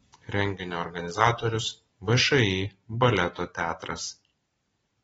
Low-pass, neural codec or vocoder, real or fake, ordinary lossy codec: 19.8 kHz; vocoder, 44.1 kHz, 128 mel bands every 256 samples, BigVGAN v2; fake; AAC, 24 kbps